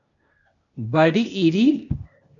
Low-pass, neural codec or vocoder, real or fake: 7.2 kHz; codec, 16 kHz, 0.8 kbps, ZipCodec; fake